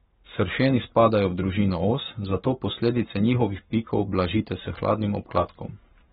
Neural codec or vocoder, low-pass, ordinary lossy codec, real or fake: codec, 44.1 kHz, 7.8 kbps, Pupu-Codec; 19.8 kHz; AAC, 16 kbps; fake